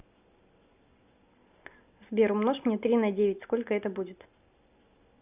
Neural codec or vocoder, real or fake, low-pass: none; real; 3.6 kHz